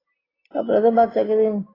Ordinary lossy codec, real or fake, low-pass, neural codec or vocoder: AAC, 24 kbps; real; 5.4 kHz; none